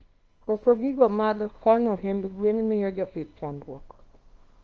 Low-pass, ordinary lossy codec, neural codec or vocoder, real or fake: 7.2 kHz; Opus, 24 kbps; codec, 24 kHz, 0.9 kbps, WavTokenizer, small release; fake